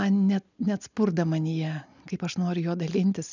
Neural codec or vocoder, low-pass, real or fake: none; 7.2 kHz; real